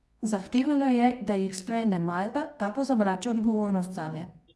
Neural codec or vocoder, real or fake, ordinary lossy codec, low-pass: codec, 24 kHz, 0.9 kbps, WavTokenizer, medium music audio release; fake; none; none